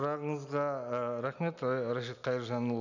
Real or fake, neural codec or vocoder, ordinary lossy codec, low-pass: real; none; none; 7.2 kHz